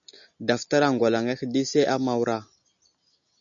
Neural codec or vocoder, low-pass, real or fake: none; 7.2 kHz; real